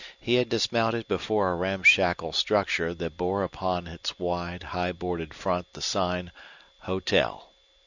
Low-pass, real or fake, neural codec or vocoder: 7.2 kHz; real; none